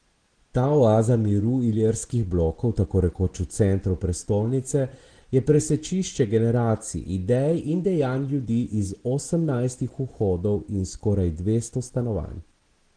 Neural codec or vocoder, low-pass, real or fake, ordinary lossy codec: none; 9.9 kHz; real; Opus, 16 kbps